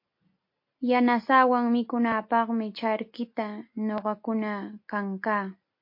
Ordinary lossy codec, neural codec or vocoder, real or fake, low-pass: MP3, 32 kbps; none; real; 5.4 kHz